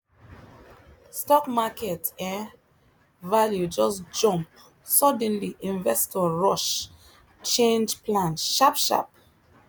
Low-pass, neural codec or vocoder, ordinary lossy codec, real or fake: none; none; none; real